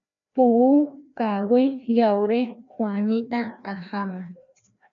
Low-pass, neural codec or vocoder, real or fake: 7.2 kHz; codec, 16 kHz, 1 kbps, FreqCodec, larger model; fake